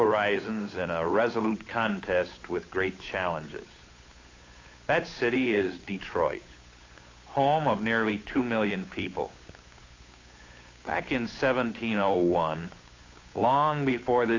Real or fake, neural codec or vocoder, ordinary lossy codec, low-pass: fake; codec, 16 kHz, 8 kbps, FunCodec, trained on Chinese and English, 25 frames a second; AAC, 32 kbps; 7.2 kHz